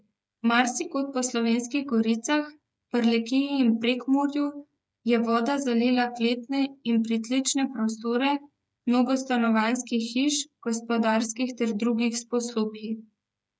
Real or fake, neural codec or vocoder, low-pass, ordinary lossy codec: fake; codec, 16 kHz, 8 kbps, FreqCodec, smaller model; none; none